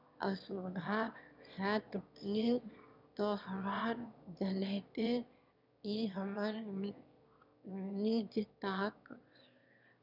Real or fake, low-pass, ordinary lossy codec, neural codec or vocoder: fake; 5.4 kHz; none; autoencoder, 22.05 kHz, a latent of 192 numbers a frame, VITS, trained on one speaker